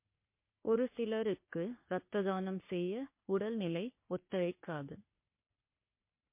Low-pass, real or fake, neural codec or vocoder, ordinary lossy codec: 3.6 kHz; fake; codec, 44.1 kHz, 3.4 kbps, Pupu-Codec; MP3, 24 kbps